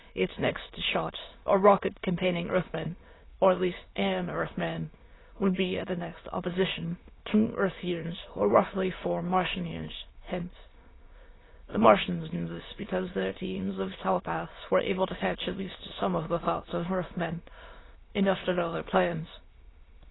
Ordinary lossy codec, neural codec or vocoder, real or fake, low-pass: AAC, 16 kbps; autoencoder, 22.05 kHz, a latent of 192 numbers a frame, VITS, trained on many speakers; fake; 7.2 kHz